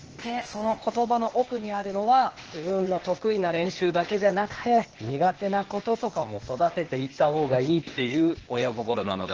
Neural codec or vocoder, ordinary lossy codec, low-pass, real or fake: codec, 16 kHz, 0.8 kbps, ZipCodec; Opus, 16 kbps; 7.2 kHz; fake